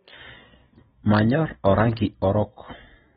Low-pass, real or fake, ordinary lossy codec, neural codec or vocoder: 14.4 kHz; real; AAC, 16 kbps; none